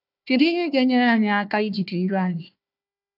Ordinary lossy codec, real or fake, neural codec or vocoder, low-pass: none; fake; codec, 16 kHz, 1 kbps, FunCodec, trained on Chinese and English, 50 frames a second; 5.4 kHz